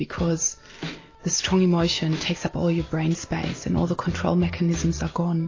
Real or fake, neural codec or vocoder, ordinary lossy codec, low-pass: real; none; AAC, 32 kbps; 7.2 kHz